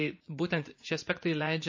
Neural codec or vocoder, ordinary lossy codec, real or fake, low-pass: vocoder, 44.1 kHz, 80 mel bands, Vocos; MP3, 32 kbps; fake; 7.2 kHz